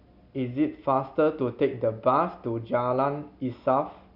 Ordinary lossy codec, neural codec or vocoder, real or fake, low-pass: none; none; real; 5.4 kHz